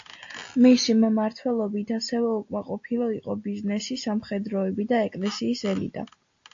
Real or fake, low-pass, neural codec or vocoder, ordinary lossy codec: real; 7.2 kHz; none; AAC, 48 kbps